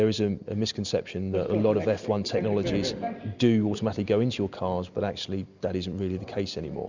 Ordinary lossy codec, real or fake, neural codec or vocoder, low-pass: Opus, 64 kbps; real; none; 7.2 kHz